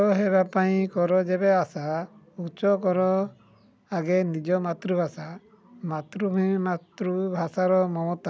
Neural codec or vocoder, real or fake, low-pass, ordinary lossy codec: none; real; none; none